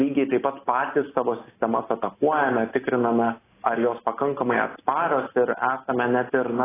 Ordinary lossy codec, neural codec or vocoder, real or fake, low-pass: AAC, 16 kbps; none; real; 3.6 kHz